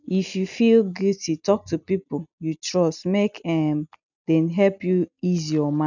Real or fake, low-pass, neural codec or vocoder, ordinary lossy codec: real; 7.2 kHz; none; none